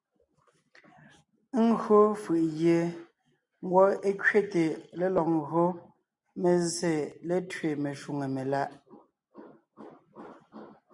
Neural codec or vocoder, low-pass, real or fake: none; 10.8 kHz; real